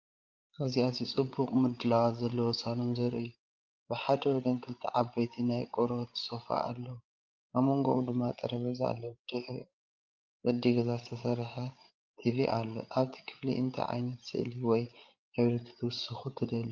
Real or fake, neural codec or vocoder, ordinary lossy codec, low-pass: fake; codec, 16 kHz, 8 kbps, FreqCodec, larger model; Opus, 24 kbps; 7.2 kHz